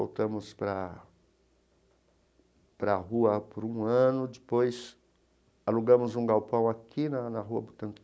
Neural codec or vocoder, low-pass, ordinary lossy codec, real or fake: none; none; none; real